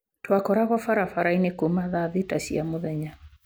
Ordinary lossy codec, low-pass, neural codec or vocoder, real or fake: none; none; none; real